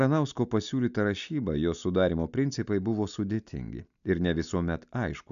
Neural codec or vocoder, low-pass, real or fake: none; 7.2 kHz; real